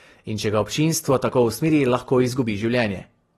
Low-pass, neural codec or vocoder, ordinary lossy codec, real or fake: 19.8 kHz; none; AAC, 32 kbps; real